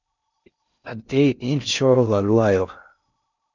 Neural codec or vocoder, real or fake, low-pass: codec, 16 kHz in and 24 kHz out, 0.6 kbps, FocalCodec, streaming, 2048 codes; fake; 7.2 kHz